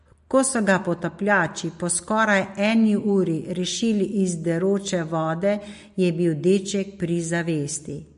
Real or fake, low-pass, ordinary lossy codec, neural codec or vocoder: real; 14.4 kHz; MP3, 48 kbps; none